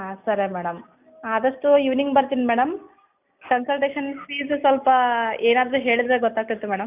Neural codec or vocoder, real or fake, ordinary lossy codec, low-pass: none; real; none; 3.6 kHz